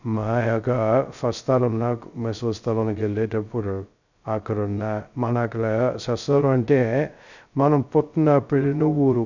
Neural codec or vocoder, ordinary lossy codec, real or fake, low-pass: codec, 16 kHz, 0.2 kbps, FocalCodec; none; fake; 7.2 kHz